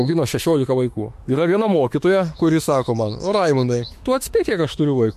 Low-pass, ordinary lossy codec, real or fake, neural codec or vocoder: 14.4 kHz; MP3, 64 kbps; fake; autoencoder, 48 kHz, 32 numbers a frame, DAC-VAE, trained on Japanese speech